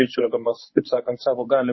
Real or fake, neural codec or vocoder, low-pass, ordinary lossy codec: fake; codec, 24 kHz, 0.9 kbps, WavTokenizer, medium speech release version 1; 7.2 kHz; MP3, 24 kbps